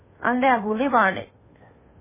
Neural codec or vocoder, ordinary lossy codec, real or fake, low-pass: codec, 16 kHz, 2 kbps, FunCodec, trained on Chinese and English, 25 frames a second; MP3, 16 kbps; fake; 3.6 kHz